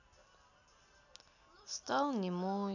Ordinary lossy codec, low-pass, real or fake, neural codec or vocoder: none; 7.2 kHz; real; none